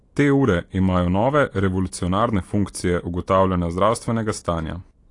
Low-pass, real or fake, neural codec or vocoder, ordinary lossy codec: 10.8 kHz; real; none; AAC, 48 kbps